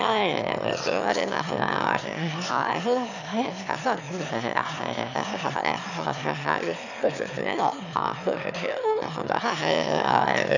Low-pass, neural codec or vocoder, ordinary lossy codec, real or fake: 7.2 kHz; autoencoder, 22.05 kHz, a latent of 192 numbers a frame, VITS, trained on one speaker; none; fake